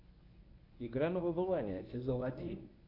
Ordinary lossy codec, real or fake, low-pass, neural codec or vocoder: AAC, 32 kbps; fake; 5.4 kHz; codec, 24 kHz, 0.9 kbps, WavTokenizer, medium speech release version 1